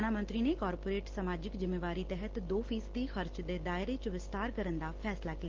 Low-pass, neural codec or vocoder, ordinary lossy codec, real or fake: 7.2 kHz; none; Opus, 16 kbps; real